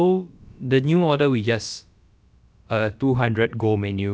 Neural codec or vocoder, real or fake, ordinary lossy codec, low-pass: codec, 16 kHz, about 1 kbps, DyCAST, with the encoder's durations; fake; none; none